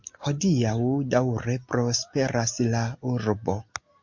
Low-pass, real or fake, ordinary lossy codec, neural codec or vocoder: 7.2 kHz; real; MP3, 48 kbps; none